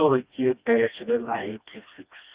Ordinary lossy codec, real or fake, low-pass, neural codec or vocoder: Opus, 64 kbps; fake; 3.6 kHz; codec, 16 kHz, 1 kbps, FreqCodec, smaller model